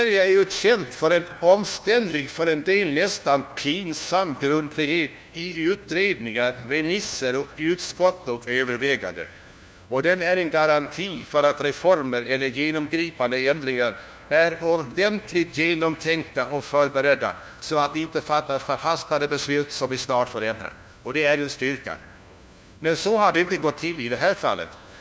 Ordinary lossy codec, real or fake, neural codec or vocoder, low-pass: none; fake; codec, 16 kHz, 1 kbps, FunCodec, trained on LibriTTS, 50 frames a second; none